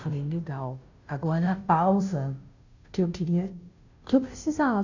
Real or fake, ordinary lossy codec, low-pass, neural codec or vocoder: fake; none; 7.2 kHz; codec, 16 kHz, 0.5 kbps, FunCodec, trained on Chinese and English, 25 frames a second